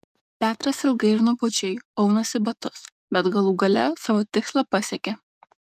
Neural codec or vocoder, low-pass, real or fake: codec, 44.1 kHz, 7.8 kbps, DAC; 14.4 kHz; fake